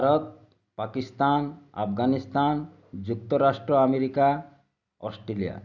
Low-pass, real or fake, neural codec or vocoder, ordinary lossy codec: 7.2 kHz; real; none; none